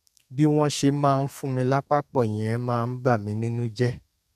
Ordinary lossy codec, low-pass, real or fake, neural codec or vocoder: none; 14.4 kHz; fake; codec, 32 kHz, 1.9 kbps, SNAC